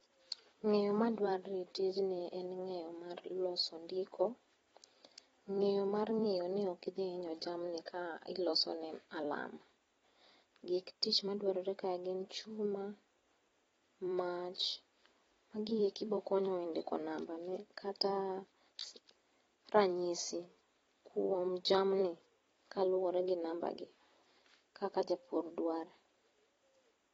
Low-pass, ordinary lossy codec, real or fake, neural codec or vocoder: 19.8 kHz; AAC, 24 kbps; fake; vocoder, 44.1 kHz, 128 mel bands every 256 samples, BigVGAN v2